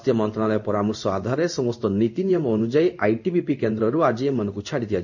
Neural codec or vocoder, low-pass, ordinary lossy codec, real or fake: codec, 16 kHz in and 24 kHz out, 1 kbps, XY-Tokenizer; 7.2 kHz; none; fake